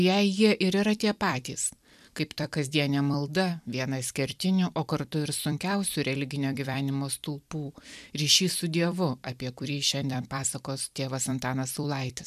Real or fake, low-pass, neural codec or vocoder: fake; 14.4 kHz; vocoder, 44.1 kHz, 128 mel bands every 256 samples, BigVGAN v2